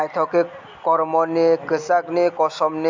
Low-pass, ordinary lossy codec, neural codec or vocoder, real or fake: 7.2 kHz; MP3, 64 kbps; none; real